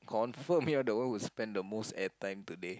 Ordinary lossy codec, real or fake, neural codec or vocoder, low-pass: none; real; none; none